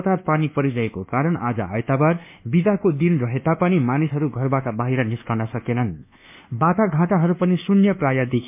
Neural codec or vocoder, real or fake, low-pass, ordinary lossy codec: codec, 24 kHz, 1.2 kbps, DualCodec; fake; 3.6 kHz; MP3, 32 kbps